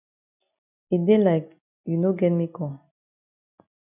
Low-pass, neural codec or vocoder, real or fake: 3.6 kHz; none; real